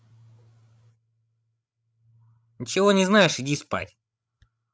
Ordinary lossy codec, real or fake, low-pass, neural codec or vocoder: none; fake; none; codec, 16 kHz, 16 kbps, FreqCodec, larger model